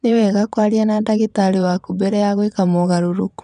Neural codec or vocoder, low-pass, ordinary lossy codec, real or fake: vocoder, 22.05 kHz, 80 mel bands, WaveNeXt; 9.9 kHz; none; fake